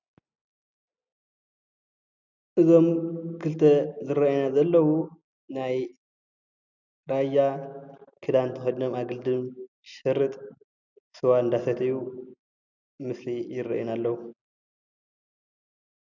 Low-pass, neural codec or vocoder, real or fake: 7.2 kHz; none; real